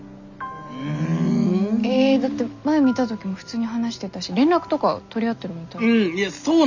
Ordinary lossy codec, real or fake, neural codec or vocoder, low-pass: none; real; none; 7.2 kHz